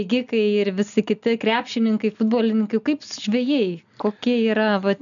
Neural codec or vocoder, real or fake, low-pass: none; real; 7.2 kHz